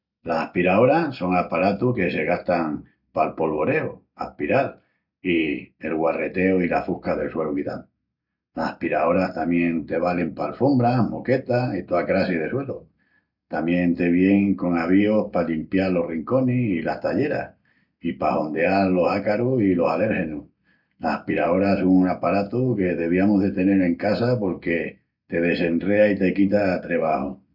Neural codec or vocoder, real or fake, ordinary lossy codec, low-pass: none; real; none; 5.4 kHz